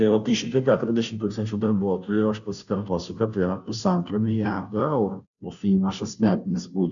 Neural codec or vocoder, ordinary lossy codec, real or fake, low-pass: codec, 16 kHz, 0.5 kbps, FunCodec, trained on Chinese and English, 25 frames a second; MP3, 96 kbps; fake; 7.2 kHz